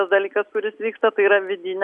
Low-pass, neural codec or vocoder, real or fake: 10.8 kHz; none; real